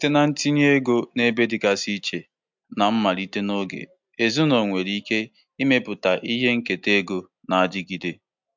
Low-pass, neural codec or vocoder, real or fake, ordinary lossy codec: 7.2 kHz; none; real; MP3, 64 kbps